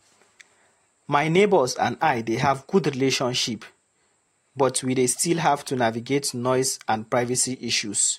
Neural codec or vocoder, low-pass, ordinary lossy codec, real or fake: none; 19.8 kHz; AAC, 48 kbps; real